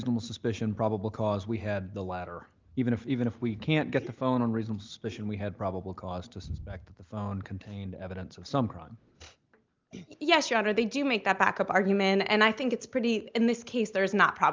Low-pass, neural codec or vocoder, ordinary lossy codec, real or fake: 7.2 kHz; none; Opus, 32 kbps; real